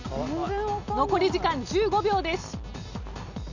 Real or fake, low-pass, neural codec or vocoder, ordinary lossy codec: real; 7.2 kHz; none; none